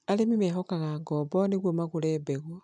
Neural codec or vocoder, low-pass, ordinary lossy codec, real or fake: none; none; none; real